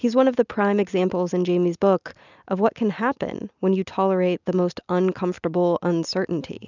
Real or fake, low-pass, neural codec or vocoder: real; 7.2 kHz; none